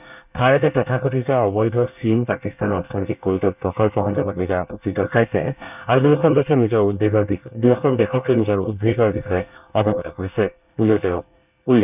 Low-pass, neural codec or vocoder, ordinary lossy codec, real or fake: 3.6 kHz; codec, 24 kHz, 1 kbps, SNAC; none; fake